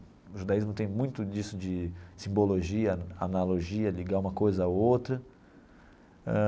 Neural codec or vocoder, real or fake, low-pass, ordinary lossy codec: none; real; none; none